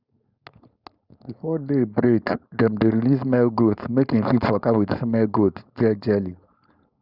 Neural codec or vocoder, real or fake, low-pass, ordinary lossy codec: codec, 16 kHz, 4.8 kbps, FACodec; fake; 5.4 kHz; Opus, 64 kbps